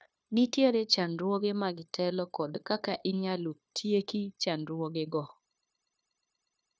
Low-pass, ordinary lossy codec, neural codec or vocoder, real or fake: none; none; codec, 16 kHz, 0.9 kbps, LongCat-Audio-Codec; fake